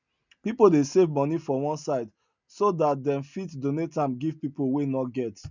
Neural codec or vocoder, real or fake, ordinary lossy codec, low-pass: none; real; none; 7.2 kHz